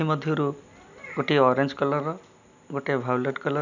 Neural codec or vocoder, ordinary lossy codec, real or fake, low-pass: none; none; real; 7.2 kHz